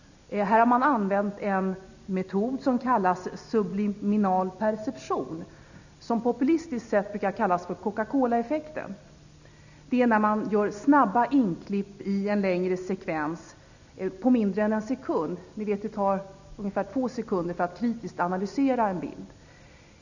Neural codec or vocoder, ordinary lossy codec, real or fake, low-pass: none; none; real; 7.2 kHz